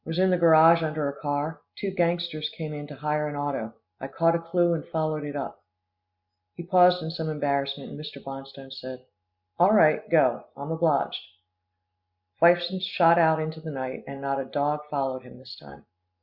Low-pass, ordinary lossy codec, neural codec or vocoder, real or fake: 5.4 kHz; Opus, 64 kbps; none; real